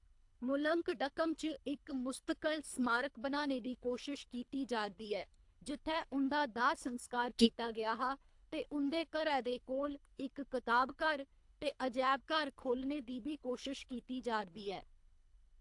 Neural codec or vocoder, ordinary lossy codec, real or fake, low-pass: codec, 24 kHz, 3 kbps, HILCodec; AAC, 64 kbps; fake; 10.8 kHz